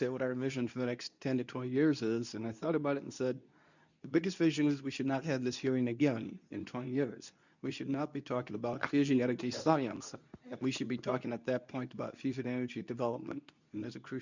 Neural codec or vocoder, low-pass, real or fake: codec, 24 kHz, 0.9 kbps, WavTokenizer, medium speech release version 2; 7.2 kHz; fake